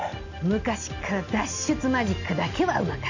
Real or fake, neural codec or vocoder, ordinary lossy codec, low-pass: real; none; none; 7.2 kHz